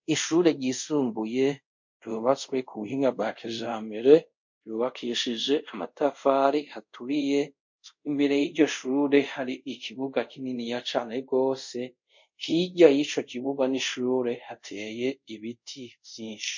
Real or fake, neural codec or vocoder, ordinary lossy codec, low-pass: fake; codec, 24 kHz, 0.5 kbps, DualCodec; MP3, 48 kbps; 7.2 kHz